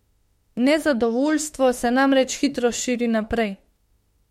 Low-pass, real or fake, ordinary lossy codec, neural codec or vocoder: 19.8 kHz; fake; MP3, 64 kbps; autoencoder, 48 kHz, 32 numbers a frame, DAC-VAE, trained on Japanese speech